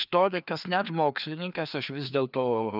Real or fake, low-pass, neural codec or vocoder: fake; 5.4 kHz; codec, 16 kHz, 2 kbps, FreqCodec, larger model